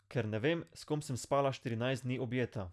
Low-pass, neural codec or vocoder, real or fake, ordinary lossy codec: none; none; real; none